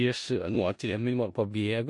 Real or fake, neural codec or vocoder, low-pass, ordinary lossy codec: fake; codec, 16 kHz in and 24 kHz out, 0.4 kbps, LongCat-Audio-Codec, four codebook decoder; 10.8 kHz; MP3, 48 kbps